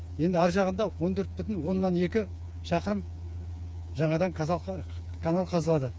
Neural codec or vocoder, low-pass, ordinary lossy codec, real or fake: codec, 16 kHz, 4 kbps, FreqCodec, smaller model; none; none; fake